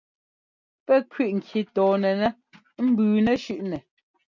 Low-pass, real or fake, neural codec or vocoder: 7.2 kHz; real; none